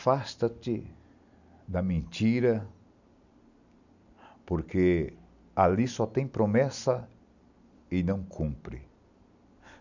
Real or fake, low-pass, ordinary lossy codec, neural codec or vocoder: real; 7.2 kHz; none; none